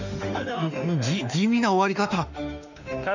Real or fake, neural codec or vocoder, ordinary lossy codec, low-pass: fake; autoencoder, 48 kHz, 32 numbers a frame, DAC-VAE, trained on Japanese speech; none; 7.2 kHz